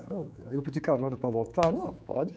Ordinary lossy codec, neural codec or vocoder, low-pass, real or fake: none; codec, 16 kHz, 4 kbps, X-Codec, HuBERT features, trained on general audio; none; fake